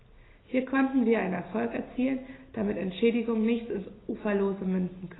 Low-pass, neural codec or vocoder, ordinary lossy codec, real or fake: 7.2 kHz; none; AAC, 16 kbps; real